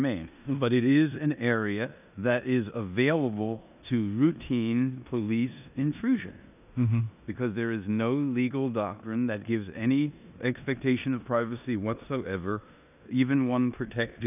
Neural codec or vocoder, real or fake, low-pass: codec, 16 kHz in and 24 kHz out, 0.9 kbps, LongCat-Audio-Codec, four codebook decoder; fake; 3.6 kHz